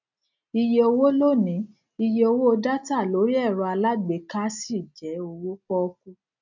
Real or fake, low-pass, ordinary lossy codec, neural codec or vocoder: real; 7.2 kHz; none; none